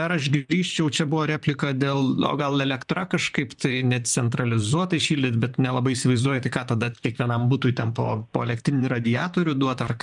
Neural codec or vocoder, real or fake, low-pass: vocoder, 44.1 kHz, 128 mel bands, Pupu-Vocoder; fake; 10.8 kHz